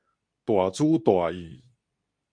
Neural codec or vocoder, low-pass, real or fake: codec, 24 kHz, 0.9 kbps, WavTokenizer, medium speech release version 2; 9.9 kHz; fake